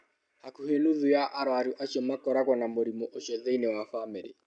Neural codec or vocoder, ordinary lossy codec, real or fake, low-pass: none; none; real; 9.9 kHz